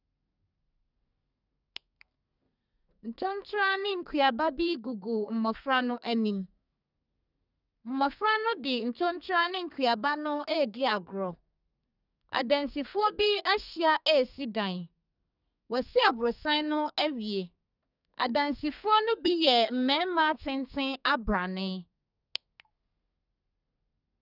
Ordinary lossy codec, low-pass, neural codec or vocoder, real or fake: none; 5.4 kHz; codec, 32 kHz, 1.9 kbps, SNAC; fake